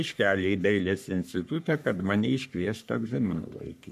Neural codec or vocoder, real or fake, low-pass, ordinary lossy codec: codec, 44.1 kHz, 3.4 kbps, Pupu-Codec; fake; 14.4 kHz; MP3, 96 kbps